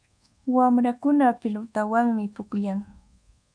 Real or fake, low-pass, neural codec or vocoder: fake; 9.9 kHz; codec, 24 kHz, 1.2 kbps, DualCodec